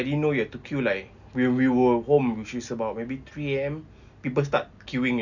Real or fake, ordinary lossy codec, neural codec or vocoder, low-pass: real; none; none; 7.2 kHz